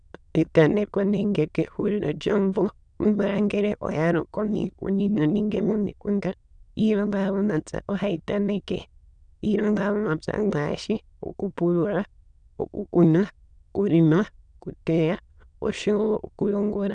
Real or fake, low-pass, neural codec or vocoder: fake; 9.9 kHz; autoencoder, 22.05 kHz, a latent of 192 numbers a frame, VITS, trained on many speakers